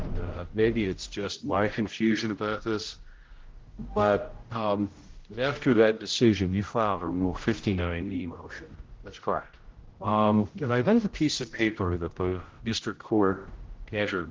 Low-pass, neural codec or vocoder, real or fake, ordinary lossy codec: 7.2 kHz; codec, 16 kHz, 0.5 kbps, X-Codec, HuBERT features, trained on general audio; fake; Opus, 16 kbps